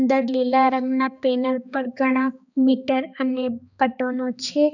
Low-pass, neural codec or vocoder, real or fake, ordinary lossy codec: 7.2 kHz; codec, 16 kHz, 2 kbps, X-Codec, HuBERT features, trained on balanced general audio; fake; none